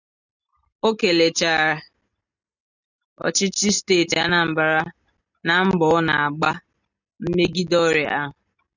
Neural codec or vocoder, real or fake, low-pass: none; real; 7.2 kHz